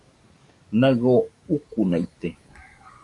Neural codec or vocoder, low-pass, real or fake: codec, 44.1 kHz, 7.8 kbps, DAC; 10.8 kHz; fake